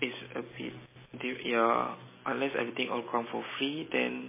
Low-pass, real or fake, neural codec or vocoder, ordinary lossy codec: 3.6 kHz; real; none; MP3, 16 kbps